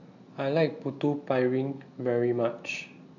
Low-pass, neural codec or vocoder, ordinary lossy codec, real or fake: 7.2 kHz; none; AAC, 48 kbps; real